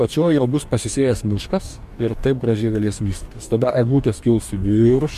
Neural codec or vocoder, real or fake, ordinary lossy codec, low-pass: codec, 44.1 kHz, 2.6 kbps, DAC; fake; MP3, 64 kbps; 14.4 kHz